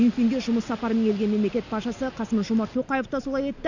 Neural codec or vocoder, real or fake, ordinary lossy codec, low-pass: none; real; none; 7.2 kHz